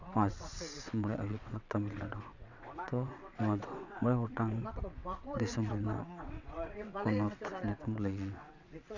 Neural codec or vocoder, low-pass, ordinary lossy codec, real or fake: vocoder, 22.05 kHz, 80 mel bands, WaveNeXt; 7.2 kHz; none; fake